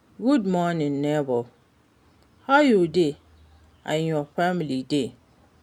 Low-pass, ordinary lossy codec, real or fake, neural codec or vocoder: 19.8 kHz; none; real; none